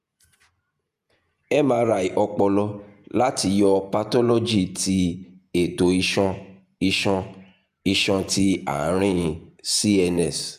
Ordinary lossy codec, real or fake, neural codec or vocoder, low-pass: none; fake; vocoder, 48 kHz, 128 mel bands, Vocos; 14.4 kHz